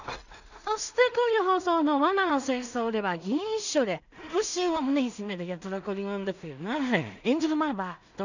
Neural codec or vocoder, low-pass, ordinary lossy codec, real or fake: codec, 16 kHz in and 24 kHz out, 0.4 kbps, LongCat-Audio-Codec, two codebook decoder; 7.2 kHz; none; fake